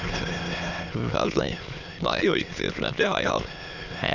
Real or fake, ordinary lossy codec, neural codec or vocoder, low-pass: fake; none; autoencoder, 22.05 kHz, a latent of 192 numbers a frame, VITS, trained on many speakers; 7.2 kHz